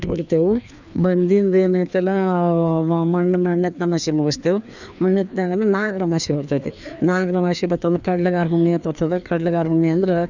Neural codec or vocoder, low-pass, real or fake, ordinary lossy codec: codec, 16 kHz, 2 kbps, FreqCodec, larger model; 7.2 kHz; fake; none